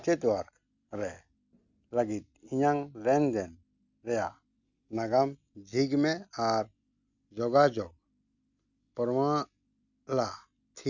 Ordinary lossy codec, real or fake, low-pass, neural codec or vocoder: none; real; 7.2 kHz; none